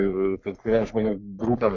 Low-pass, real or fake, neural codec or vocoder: 7.2 kHz; fake; codec, 44.1 kHz, 3.4 kbps, Pupu-Codec